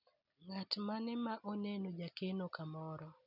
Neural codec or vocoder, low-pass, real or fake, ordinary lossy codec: none; 5.4 kHz; real; none